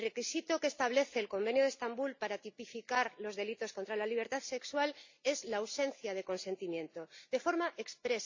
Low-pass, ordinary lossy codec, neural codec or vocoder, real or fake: 7.2 kHz; MP3, 64 kbps; none; real